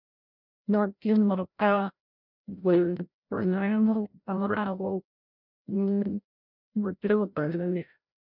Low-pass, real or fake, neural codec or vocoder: 5.4 kHz; fake; codec, 16 kHz, 0.5 kbps, FreqCodec, larger model